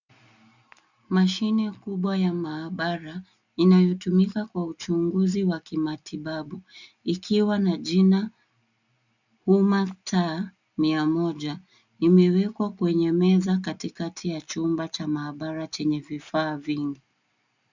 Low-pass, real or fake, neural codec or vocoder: 7.2 kHz; real; none